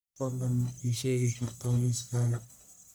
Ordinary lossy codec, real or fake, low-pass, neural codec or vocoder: none; fake; none; codec, 44.1 kHz, 1.7 kbps, Pupu-Codec